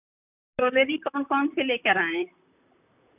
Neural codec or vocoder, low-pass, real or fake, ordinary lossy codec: vocoder, 44.1 kHz, 128 mel bands, Pupu-Vocoder; 3.6 kHz; fake; none